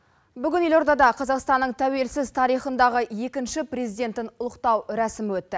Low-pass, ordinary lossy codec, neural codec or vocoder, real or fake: none; none; none; real